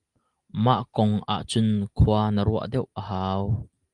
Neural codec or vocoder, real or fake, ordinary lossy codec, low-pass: none; real; Opus, 32 kbps; 10.8 kHz